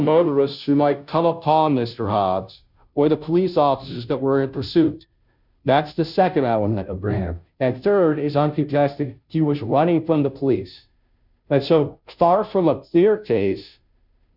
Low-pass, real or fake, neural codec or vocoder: 5.4 kHz; fake; codec, 16 kHz, 0.5 kbps, FunCodec, trained on Chinese and English, 25 frames a second